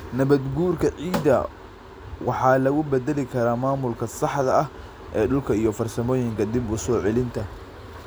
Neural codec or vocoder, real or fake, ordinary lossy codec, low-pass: none; real; none; none